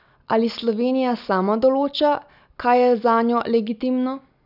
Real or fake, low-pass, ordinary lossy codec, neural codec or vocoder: real; 5.4 kHz; none; none